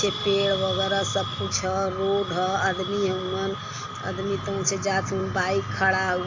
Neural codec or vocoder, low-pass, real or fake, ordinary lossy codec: none; 7.2 kHz; real; AAC, 48 kbps